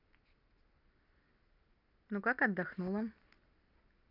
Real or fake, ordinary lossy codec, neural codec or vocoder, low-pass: real; none; none; 5.4 kHz